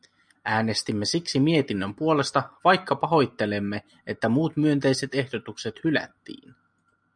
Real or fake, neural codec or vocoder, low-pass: real; none; 9.9 kHz